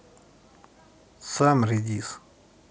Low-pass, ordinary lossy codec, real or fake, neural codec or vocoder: none; none; real; none